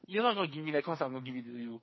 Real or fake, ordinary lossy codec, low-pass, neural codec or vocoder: fake; MP3, 24 kbps; 7.2 kHz; codec, 44.1 kHz, 2.6 kbps, SNAC